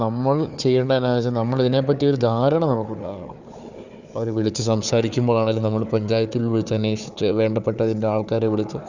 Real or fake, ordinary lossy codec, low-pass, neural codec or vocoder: fake; none; 7.2 kHz; codec, 16 kHz, 4 kbps, FunCodec, trained on Chinese and English, 50 frames a second